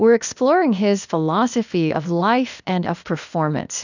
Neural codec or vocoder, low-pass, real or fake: codec, 16 kHz, 0.8 kbps, ZipCodec; 7.2 kHz; fake